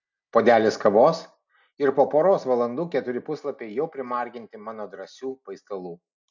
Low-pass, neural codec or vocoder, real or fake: 7.2 kHz; none; real